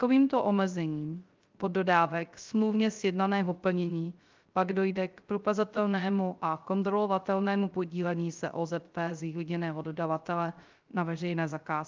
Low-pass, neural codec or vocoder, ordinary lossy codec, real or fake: 7.2 kHz; codec, 16 kHz, 0.3 kbps, FocalCodec; Opus, 24 kbps; fake